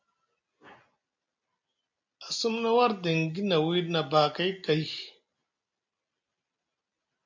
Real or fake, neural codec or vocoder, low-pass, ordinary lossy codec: real; none; 7.2 kHz; MP3, 64 kbps